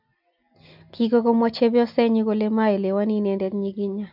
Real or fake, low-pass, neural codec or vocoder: real; 5.4 kHz; none